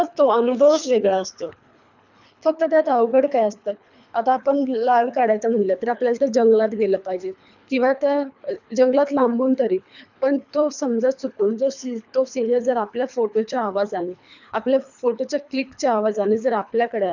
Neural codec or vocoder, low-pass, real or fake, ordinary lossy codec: codec, 24 kHz, 3 kbps, HILCodec; 7.2 kHz; fake; none